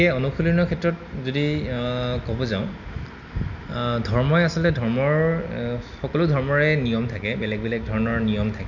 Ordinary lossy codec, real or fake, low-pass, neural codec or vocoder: none; real; 7.2 kHz; none